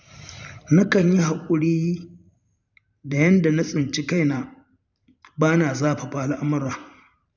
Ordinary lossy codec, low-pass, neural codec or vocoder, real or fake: none; 7.2 kHz; vocoder, 44.1 kHz, 128 mel bands every 512 samples, BigVGAN v2; fake